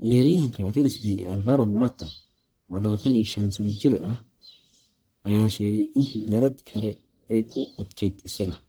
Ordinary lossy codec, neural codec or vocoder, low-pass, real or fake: none; codec, 44.1 kHz, 1.7 kbps, Pupu-Codec; none; fake